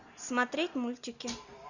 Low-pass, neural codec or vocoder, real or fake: 7.2 kHz; none; real